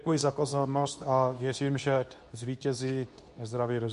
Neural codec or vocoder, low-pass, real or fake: codec, 24 kHz, 0.9 kbps, WavTokenizer, medium speech release version 2; 10.8 kHz; fake